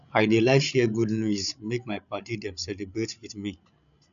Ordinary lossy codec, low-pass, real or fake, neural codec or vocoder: none; 7.2 kHz; fake; codec, 16 kHz, 16 kbps, FreqCodec, larger model